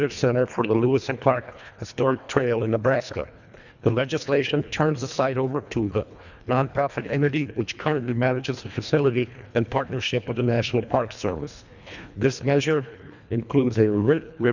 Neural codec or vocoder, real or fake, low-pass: codec, 24 kHz, 1.5 kbps, HILCodec; fake; 7.2 kHz